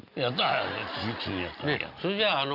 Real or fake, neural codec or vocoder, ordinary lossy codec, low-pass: real; none; Opus, 64 kbps; 5.4 kHz